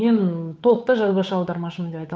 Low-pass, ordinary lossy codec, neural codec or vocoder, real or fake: none; none; codec, 16 kHz, 8 kbps, FunCodec, trained on Chinese and English, 25 frames a second; fake